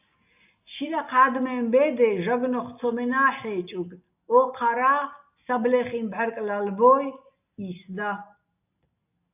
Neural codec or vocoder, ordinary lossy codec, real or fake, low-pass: none; AAC, 32 kbps; real; 3.6 kHz